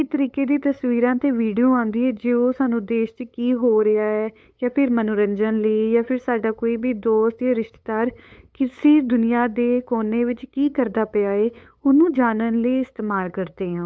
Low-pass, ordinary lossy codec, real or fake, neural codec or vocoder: none; none; fake; codec, 16 kHz, 8 kbps, FunCodec, trained on LibriTTS, 25 frames a second